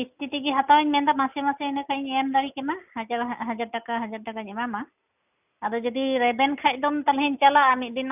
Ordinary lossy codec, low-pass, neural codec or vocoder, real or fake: none; 3.6 kHz; none; real